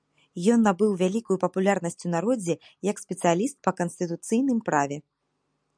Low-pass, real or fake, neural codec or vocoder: 9.9 kHz; real; none